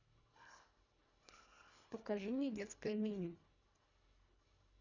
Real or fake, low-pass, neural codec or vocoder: fake; 7.2 kHz; codec, 24 kHz, 1.5 kbps, HILCodec